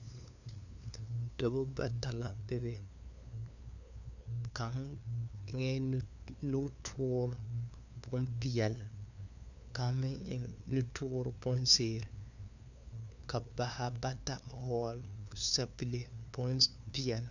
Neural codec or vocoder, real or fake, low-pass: codec, 16 kHz, 2 kbps, FunCodec, trained on LibriTTS, 25 frames a second; fake; 7.2 kHz